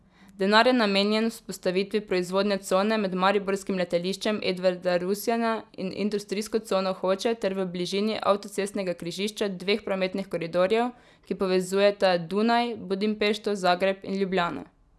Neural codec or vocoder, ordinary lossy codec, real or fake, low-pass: none; none; real; none